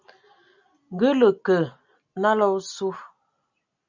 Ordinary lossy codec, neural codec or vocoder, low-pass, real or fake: MP3, 64 kbps; none; 7.2 kHz; real